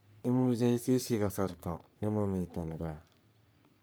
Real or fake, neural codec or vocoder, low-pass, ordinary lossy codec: fake; codec, 44.1 kHz, 3.4 kbps, Pupu-Codec; none; none